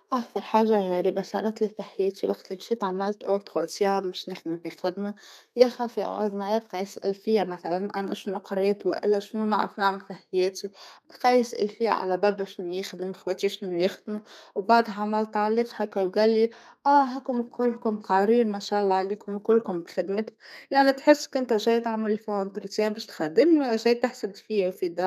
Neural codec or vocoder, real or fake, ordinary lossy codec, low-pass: codec, 32 kHz, 1.9 kbps, SNAC; fake; none; 14.4 kHz